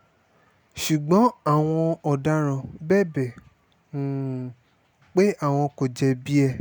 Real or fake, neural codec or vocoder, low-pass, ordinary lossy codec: real; none; none; none